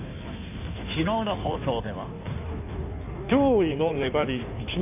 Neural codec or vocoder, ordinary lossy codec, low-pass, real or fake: codec, 16 kHz in and 24 kHz out, 1.1 kbps, FireRedTTS-2 codec; none; 3.6 kHz; fake